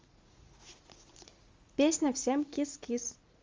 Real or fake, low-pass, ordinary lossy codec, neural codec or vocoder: fake; 7.2 kHz; Opus, 32 kbps; vocoder, 44.1 kHz, 80 mel bands, Vocos